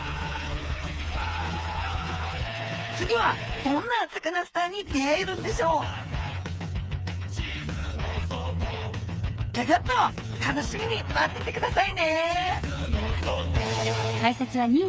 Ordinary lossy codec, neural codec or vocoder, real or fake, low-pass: none; codec, 16 kHz, 4 kbps, FreqCodec, smaller model; fake; none